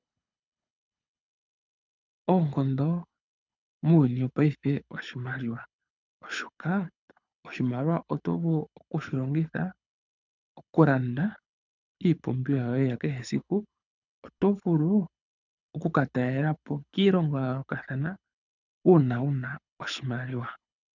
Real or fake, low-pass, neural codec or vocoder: fake; 7.2 kHz; codec, 24 kHz, 6 kbps, HILCodec